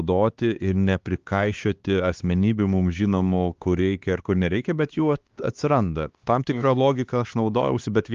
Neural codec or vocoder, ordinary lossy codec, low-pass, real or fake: codec, 16 kHz, 2 kbps, X-Codec, HuBERT features, trained on LibriSpeech; Opus, 24 kbps; 7.2 kHz; fake